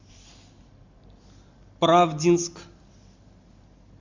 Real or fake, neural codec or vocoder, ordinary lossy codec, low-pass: real; none; MP3, 64 kbps; 7.2 kHz